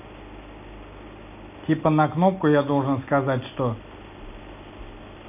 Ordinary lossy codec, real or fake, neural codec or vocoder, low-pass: none; real; none; 3.6 kHz